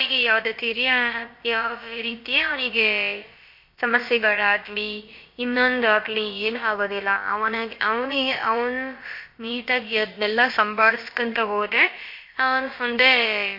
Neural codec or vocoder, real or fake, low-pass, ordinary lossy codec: codec, 16 kHz, about 1 kbps, DyCAST, with the encoder's durations; fake; 5.4 kHz; MP3, 32 kbps